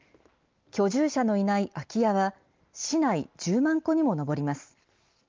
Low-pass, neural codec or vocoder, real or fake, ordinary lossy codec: 7.2 kHz; none; real; Opus, 24 kbps